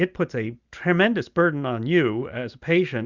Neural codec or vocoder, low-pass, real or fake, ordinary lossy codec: none; 7.2 kHz; real; Opus, 64 kbps